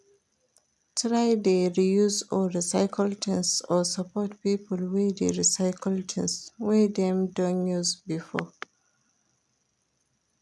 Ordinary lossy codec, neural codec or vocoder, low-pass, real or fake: none; none; none; real